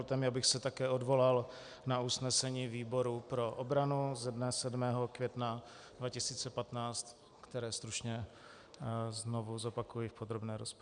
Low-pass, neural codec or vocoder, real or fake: 9.9 kHz; none; real